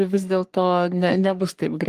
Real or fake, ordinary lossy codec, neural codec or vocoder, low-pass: fake; Opus, 24 kbps; codec, 44.1 kHz, 3.4 kbps, Pupu-Codec; 14.4 kHz